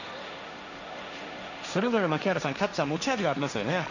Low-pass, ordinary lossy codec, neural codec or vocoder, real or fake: 7.2 kHz; none; codec, 16 kHz, 1.1 kbps, Voila-Tokenizer; fake